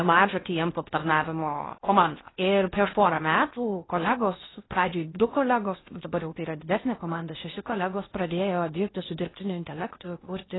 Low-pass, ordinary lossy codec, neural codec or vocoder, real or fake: 7.2 kHz; AAC, 16 kbps; codec, 16 kHz in and 24 kHz out, 0.6 kbps, FocalCodec, streaming, 4096 codes; fake